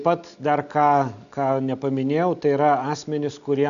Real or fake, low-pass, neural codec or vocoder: real; 7.2 kHz; none